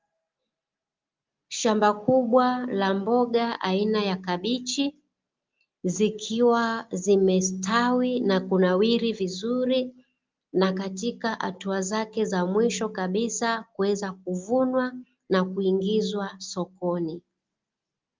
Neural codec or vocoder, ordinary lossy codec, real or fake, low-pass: none; Opus, 24 kbps; real; 7.2 kHz